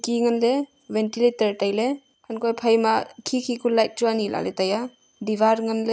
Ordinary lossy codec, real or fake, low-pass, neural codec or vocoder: none; real; none; none